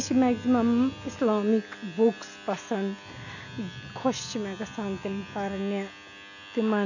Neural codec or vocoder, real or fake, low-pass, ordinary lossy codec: none; real; 7.2 kHz; none